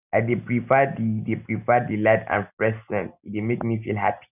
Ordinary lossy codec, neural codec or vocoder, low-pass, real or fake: none; none; 3.6 kHz; real